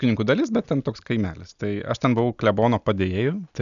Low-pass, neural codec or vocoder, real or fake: 7.2 kHz; none; real